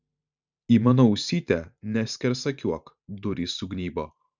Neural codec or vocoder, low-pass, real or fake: vocoder, 44.1 kHz, 128 mel bands every 512 samples, BigVGAN v2; 7.2 kHz; fake